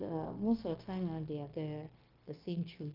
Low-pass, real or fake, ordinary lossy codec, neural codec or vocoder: 5.4 kHz; fake; Opus, 32 kbps; codec, 16 kHz, 0.9 kbps, LongCat-Audio-Codec